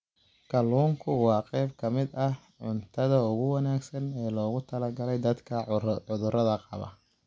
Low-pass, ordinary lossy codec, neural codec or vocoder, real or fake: none; none; none; real